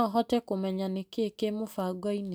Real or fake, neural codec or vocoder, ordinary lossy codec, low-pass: real; none; none; none